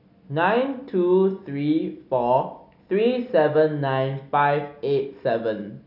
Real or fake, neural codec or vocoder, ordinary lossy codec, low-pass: real; none; none; 5.4 kHz